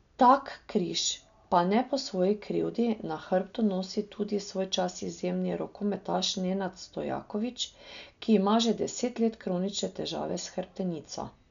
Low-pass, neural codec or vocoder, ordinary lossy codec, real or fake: 7.2 kHz; none; none; real